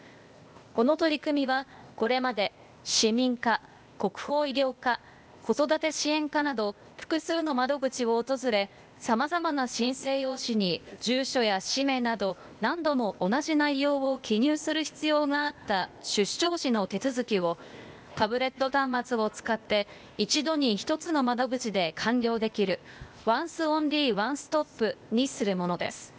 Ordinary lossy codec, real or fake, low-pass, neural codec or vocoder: none; fake; none; codec, 16 kHz, 0.8 kbps, ZipCodec